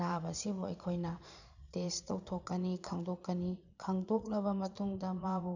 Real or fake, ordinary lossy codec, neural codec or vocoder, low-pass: fake; none; vocoder, 22.05 kHz, 80 mel bands, Vocos; 7.2 kHz